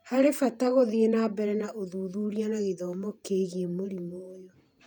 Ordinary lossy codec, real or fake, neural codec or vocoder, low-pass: none; fake; vocoder, 48 kHz, 128 mel bands, Vocos; 19.8 kHz